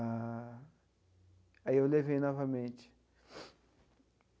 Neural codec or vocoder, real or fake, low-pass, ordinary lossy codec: none; real; none; none